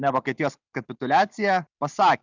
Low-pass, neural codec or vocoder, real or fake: 7.2 kHz; none; real